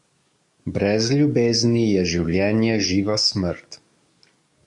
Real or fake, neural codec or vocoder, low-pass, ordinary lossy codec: fake; codec, 44.1 kHz, 7.8 kbps, DAC; 10.8 kHz; MP3, 64 kbps